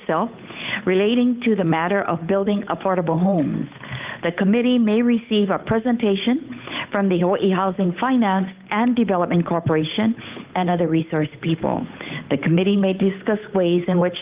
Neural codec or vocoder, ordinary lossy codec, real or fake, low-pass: codec, 16 kHz, 8 kbps, FunCodec, trained on Chinese and English, 25 frames a second; Opus, 32 kbps; fake; 3.6 kHz